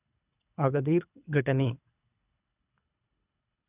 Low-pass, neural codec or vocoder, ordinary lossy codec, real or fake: 3.6 kHz; codec, 24 kHz, 3 kbps, HILCodec; none; fake